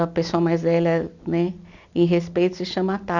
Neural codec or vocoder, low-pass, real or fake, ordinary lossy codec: none; 7.2 kHz; real; none